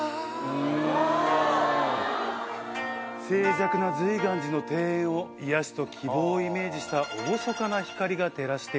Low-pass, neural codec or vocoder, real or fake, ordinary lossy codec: none; none; real; none